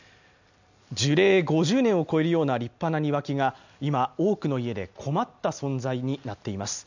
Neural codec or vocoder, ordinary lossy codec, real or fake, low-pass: none; none; real; 7.2 kHz